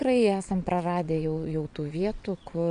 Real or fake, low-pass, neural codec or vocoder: fake; 9.9 kHz; vocoder, 22.05 kHz, 80 mel bands, WaveNeXt